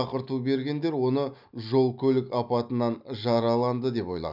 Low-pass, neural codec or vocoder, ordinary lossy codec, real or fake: 5.4 kHz; none; none; real